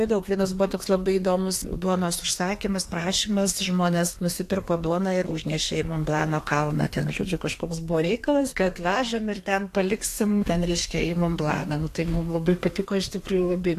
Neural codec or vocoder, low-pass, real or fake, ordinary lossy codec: codec, 32 kHz, 1.9 kbps, SNAC; 14.4 kHz; fake; AAC, 64 kbps